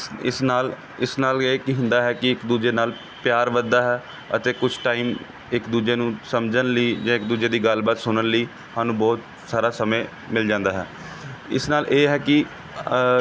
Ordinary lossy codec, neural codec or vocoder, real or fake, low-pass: none; none; real; none